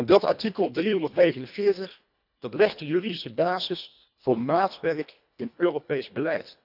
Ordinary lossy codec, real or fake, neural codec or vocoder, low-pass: none; fake; codec, 24 kHz, 1.5 kbps, HILCodec; 5.4 kHz